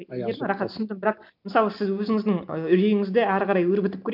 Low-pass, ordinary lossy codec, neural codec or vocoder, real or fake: 5.4 kHz; MP3, 48 kbps; none; real